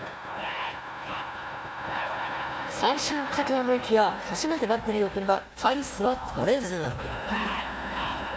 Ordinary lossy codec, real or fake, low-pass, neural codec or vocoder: none; fake; none; codec, 16 kHz, 1 kbps, FunCodec, trained on Chinese and English, 50 frames a second